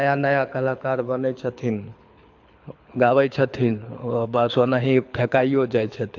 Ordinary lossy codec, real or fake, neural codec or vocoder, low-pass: AAC, 48 kbps; fake; codec, 24 kHz, 6 kbps, HILCodec; 7.2 kHz